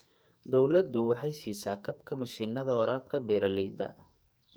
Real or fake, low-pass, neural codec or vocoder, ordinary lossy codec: fake; none; codec, 44.1 kHz, 2.6 kbps, SNAC; none